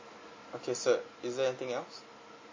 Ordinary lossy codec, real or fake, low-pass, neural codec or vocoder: MP3, 32 kbps; real; 7.2 kHz; none